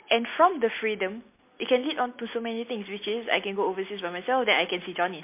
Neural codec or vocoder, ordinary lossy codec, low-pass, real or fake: none; MP3, 24 kbps; 3.6 kHz; real